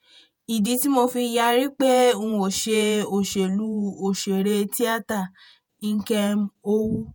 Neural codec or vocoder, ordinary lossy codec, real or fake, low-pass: vocoder, 48 kHz, 128 mel bands, Vocos; none; fake; none